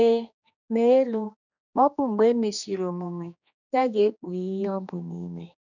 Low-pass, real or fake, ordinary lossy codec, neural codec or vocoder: 7.2 kHz; fake; none; codec, 16 kHz, 2 kbps, X-Codec, HuBERT features, trained on general audio